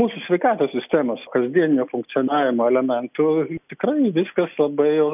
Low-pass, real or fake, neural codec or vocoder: 3.6 kHz; real; none